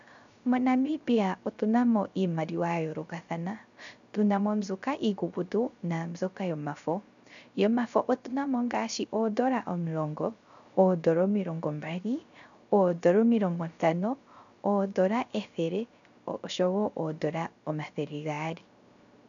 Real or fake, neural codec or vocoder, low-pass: fake; codec, 16 kHz, 0.3 kbps, FocalCodec; 7.2 kHz